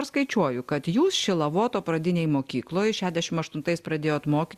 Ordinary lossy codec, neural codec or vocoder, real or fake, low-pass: Opus, 64 kbps; none; real; 14.4 kHz